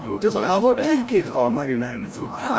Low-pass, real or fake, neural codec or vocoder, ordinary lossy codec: none; fake; codec, 16 kHz, 0.5 kbps, FreqCodec, larger model; none